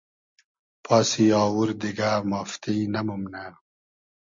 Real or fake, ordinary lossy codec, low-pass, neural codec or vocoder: real; MP3, 48 kbps; 7.2 kHz; none